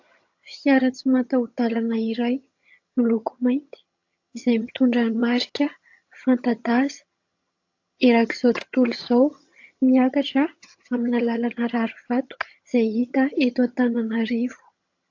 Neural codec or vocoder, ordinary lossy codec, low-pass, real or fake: vocoder, 22.05 kHz, 80 mel bands, HiFi-GAN; AAC, 48 kbps; 7.2 kHz; fake